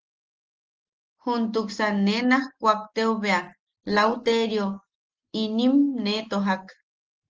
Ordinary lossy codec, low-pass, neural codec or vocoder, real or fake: Opus, 16 kbps; 7.2 kHz; none; real